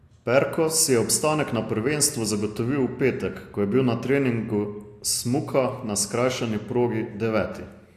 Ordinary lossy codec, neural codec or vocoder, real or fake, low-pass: AAC, 64 kbps; none; real; 14.4 kHz